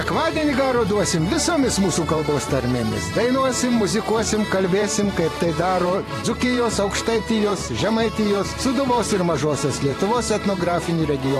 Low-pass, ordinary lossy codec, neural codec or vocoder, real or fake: 14.4 kHz; AAC, 48 kbps; vocoder, 44.1 kHz, 128 mel bands every 512 samples, BigVGAN v2; fake